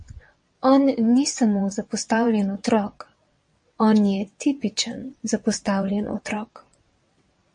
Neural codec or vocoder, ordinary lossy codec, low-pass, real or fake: vocoder, 22.05 kHz, 80 mel bands, WaveNeXt; MP3, 48 kbps; 9.9 kHz; fake